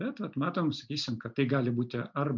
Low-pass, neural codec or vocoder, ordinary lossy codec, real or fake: 7.2 kHz; none; MP3, 64 kbps; real